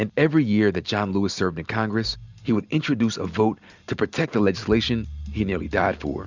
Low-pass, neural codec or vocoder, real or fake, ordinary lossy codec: 7.2 kHz; none; real; Opus, 64 kbps